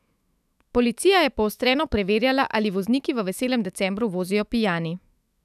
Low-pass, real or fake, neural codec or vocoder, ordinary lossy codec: 14.4 kHz; fake; autoencoder, 48 kHz, 128 numbers a frame, DAC-VAE, trained on Japanese speech; none